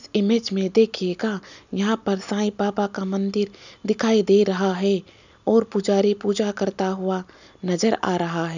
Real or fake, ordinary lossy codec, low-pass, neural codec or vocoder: real; none; 7.2 kHz; none